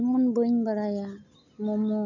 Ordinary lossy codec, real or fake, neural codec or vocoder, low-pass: none; real; none; 7.2 kHz